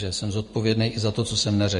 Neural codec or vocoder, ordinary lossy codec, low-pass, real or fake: none; MP3, 48 kbps; 14.4 kHz; real